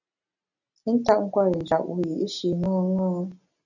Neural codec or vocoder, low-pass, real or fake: none; 7.2 kHz; real